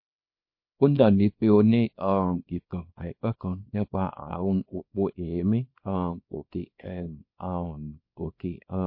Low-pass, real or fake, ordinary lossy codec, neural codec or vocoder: 5.4 kHz; fake; MP3, 32 kbps; codec, 24 kHz, 0.9 kbps, WavTokenizer, small release